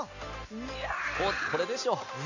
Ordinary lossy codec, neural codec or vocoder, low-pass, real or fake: none; none; 7.2 kHz; real